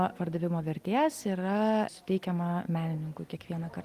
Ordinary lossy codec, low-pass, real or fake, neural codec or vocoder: Opus, 24 kbps; 14.4 kHz; real; none